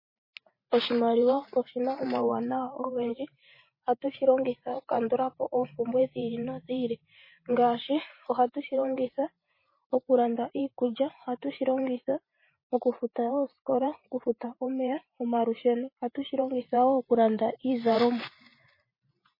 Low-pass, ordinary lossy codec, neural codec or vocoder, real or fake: 5.4 kHz; MP3, 24 kbps; vocoder, 44.1 kHz, 128 mel bands every 512 samples, BigVGAN v2; fake